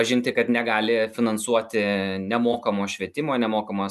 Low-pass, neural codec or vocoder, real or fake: 14.4 kHz; none; real